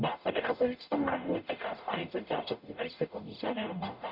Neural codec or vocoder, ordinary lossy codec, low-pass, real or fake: codec, 44.1 kHz, 0.9 kbps, DAC; none; 5.4 kHz; fake